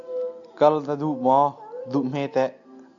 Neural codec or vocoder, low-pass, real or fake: none; 7.2 kHz; real